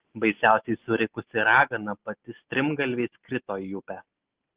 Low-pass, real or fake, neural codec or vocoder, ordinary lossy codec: 3.6 kHz; real; none; Opus, 16 kbps